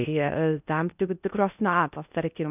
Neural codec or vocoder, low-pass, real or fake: codec, 16 kHz in and 24 kHz out, 0.6 kbps, FocalCodec, streaming, 2048 codes; 3.6 kHz; fake